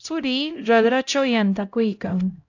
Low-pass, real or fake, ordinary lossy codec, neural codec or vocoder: 7.2 kHz; fake; AAC, 48 kbps; codec, 16 kHz, 0.5 kbps, X-Codec, HuBERT features, trained on LibriSpeech